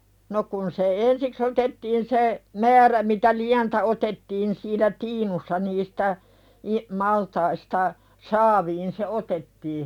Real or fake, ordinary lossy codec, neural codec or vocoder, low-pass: real; none; none; 19.8 kHz